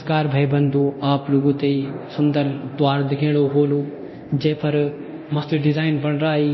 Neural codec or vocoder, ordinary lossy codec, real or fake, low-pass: codec, 24 kHz, 0.9 kbps, DualCodec; MP3, 24 kbps; fake; 7.2 kHz